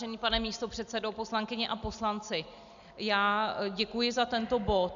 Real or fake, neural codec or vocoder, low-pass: real; none; 7.2 kHz